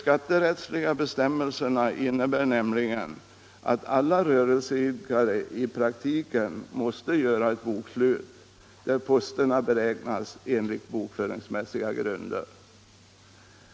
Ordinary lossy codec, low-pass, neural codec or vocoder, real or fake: none; none; none; real